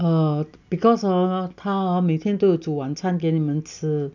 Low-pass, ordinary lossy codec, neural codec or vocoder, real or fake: 7.2 kHz; none; none; real